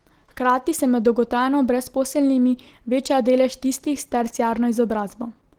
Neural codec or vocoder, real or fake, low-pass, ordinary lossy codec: none; real; 19.8 kHz; Opus, 16 kbps